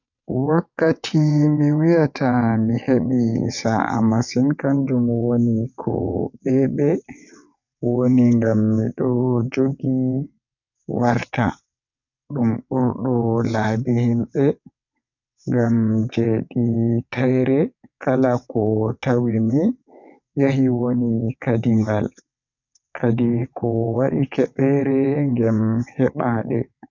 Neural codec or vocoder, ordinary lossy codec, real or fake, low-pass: vocoder, 22.05 kHz, 80 mel bands, WaveNeXt; none; fake; 7.2 kHz